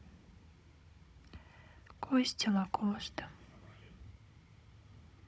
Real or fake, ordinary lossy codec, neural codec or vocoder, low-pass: fake; none; codec, 16 kHz, 16 kbps, FunCodec, trained on Chinese and English, 50 frames a second; none